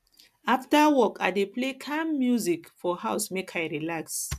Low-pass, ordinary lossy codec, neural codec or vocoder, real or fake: 14.4 kHz; none; none; real